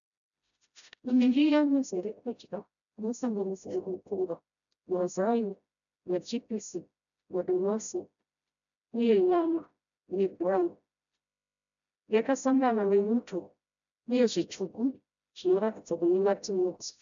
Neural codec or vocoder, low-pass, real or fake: codec, 16 kHz, 0.5 kbps, FreqCodec, smaller model; 7.2 kHz; fake